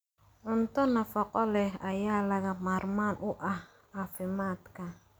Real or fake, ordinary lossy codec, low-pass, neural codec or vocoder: real; none; none; none